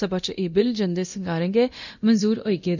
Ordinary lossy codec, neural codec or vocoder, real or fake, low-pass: none; codec, 24 kHz, 0.9 kbps, DualCodec; fake; 7.2 kHz